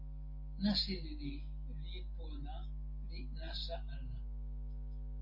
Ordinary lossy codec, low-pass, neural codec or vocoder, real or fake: MP3, 32 kbps; 5.4 kHz; none; real